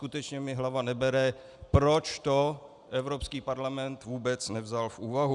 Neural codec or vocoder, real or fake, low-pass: none; real; 10.8 kHz